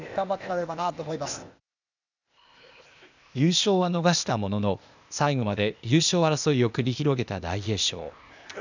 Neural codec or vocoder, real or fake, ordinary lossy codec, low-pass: codec, 16 kHz, 0.8 kbps, ZipCodec; fake; none; 7.2 kHz